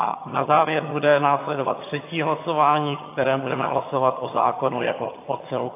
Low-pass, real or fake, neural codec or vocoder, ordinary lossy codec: 3.6 kHz; fake; vocoder, 22.05 kHz, 80 mel bands, HiFi-GAN; AAC, 24 kbps